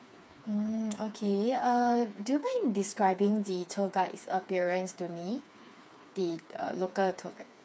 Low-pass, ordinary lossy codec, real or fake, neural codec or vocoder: none; none; fake; codec, 16 kHz, 4 kbps, FreqCodec, smaller model